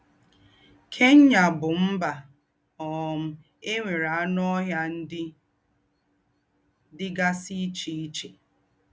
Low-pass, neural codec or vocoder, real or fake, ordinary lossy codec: none; none; real; none